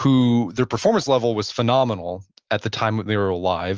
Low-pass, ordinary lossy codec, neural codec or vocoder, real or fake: 7.2 kHz; Opus, 24 kbps; none; real